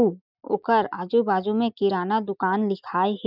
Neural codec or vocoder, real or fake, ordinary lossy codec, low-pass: none; real; none; 5.4 kHz